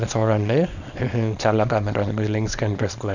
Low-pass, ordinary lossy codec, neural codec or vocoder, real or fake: 7.2 kHz; none; codec, 24 kHz, 0.9 kbps, WavTokenizer, small release; fake